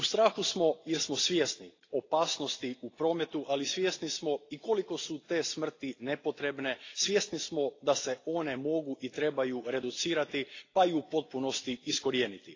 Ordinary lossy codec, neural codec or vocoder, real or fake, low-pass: AAC, 32 kbps; none; real; 7.2 kHz